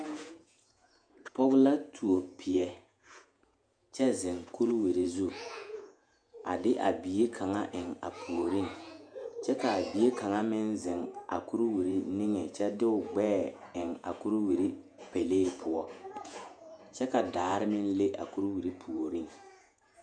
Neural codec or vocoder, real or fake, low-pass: vocoder, 48 kHz, 128 mel bands, Vocos; fake; 9.9 kHz